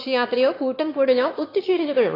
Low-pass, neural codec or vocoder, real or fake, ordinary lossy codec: 5.4 kHz; autoencoder, 22.05 kHz, a latent of 192 numbers a frame, VITS, trained on one speaker; fake; AAC, 24 kbps